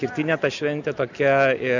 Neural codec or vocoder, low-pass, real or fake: none; 7.2 kHz; real